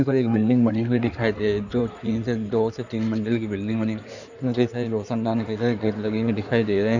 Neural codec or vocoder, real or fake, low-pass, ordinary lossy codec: codec, 16 kHz in and 24 kHz out, 2.2 kbps, FireRedTTS-2 codec; fake; 7.2 kHz; none